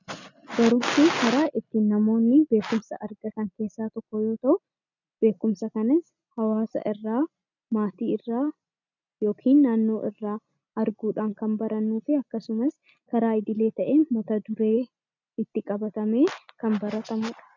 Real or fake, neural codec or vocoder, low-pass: real; none; 7.2 kHz